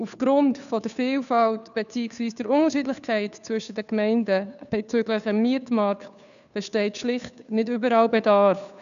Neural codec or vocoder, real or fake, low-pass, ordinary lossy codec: codec, 16 kHz, 2 kbps, FunCodec, trained on Chinese and English, 25 frames a second; fake; 7.2 kHz; none